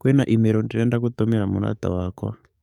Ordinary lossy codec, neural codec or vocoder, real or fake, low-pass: none; codec, 44.1 kHz, 7.8 kbps, DAC; fake; 19.8 kHz